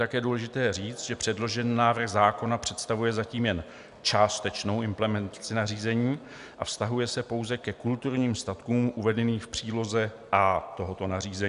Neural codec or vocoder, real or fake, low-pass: none; real; 10.8 kHz